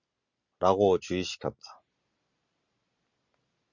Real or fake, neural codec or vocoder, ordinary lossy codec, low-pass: real; none; Opus, 64 kbps; 7.2 kHz